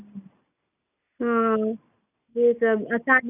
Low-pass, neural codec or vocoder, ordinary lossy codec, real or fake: 3.6 kHz; none; none; real